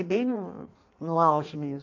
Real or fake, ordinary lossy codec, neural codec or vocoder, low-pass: fake; AAC, 48 kbps; codec, 16 kHz in and 24 kHz out, 1.1 kbps, FireRedTTS-2 codec; 7.2 kHz